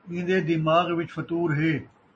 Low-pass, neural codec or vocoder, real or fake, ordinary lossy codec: 9.9 kHz; none; real; MP3, 32 kbps